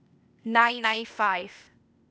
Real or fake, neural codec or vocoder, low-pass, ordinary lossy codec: fake; codec, 16 kHz, 0.8 kbps, ZipCodec; none; none